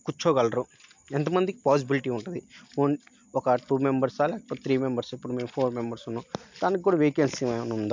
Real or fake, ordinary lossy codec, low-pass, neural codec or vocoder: real; MP3, 64 kbps; 7.2 kHz; none